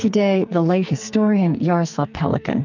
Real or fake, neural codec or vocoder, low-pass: fake; codec, 44.1 kHz, 2.6 kbps, SNAC; 7.2 kHz